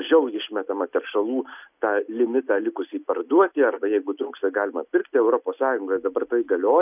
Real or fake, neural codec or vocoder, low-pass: real; none; 3.6 kHz